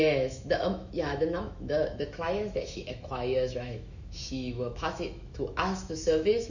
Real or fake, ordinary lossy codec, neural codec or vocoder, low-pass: fake; none; autoencoder, 48 kHz, 128 numbers a frame, DAC-VAE, trained on Japanese speech; 7.2 kHz